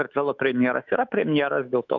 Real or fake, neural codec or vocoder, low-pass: fake; autoencoder, 48 kHz, 128 numbers a frame, DAC-VAE, trained on Japanese speech; 7.2 kHz